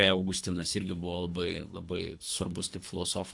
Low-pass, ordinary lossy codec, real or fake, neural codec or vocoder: 10.8 kHz; AAC, 64 kbps; fake; codec, 24 kHz, 3 kbps, HILCodec